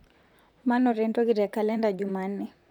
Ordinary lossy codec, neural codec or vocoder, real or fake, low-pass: none; vocoder, 44.1 kHz, 128 mel bands, Pupu-Vocoder; fake; 19.8 kHz